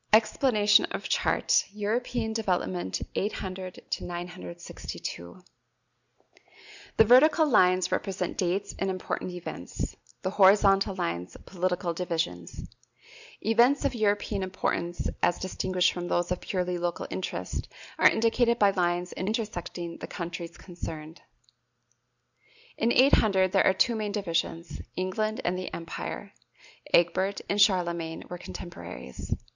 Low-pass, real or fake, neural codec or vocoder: 7.2 kHz; real; none